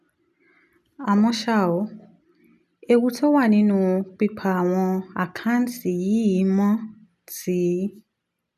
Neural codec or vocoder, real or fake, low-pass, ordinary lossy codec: none; real; 14.4 kHz; none